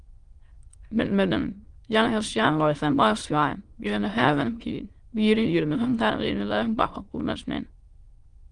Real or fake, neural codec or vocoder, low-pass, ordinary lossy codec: fake; autoencoder, 22.05 kHz, a latent of 192 numbers a frame, VITS, trained on many speakers; 9.9 kHz; Opus, 24 kbps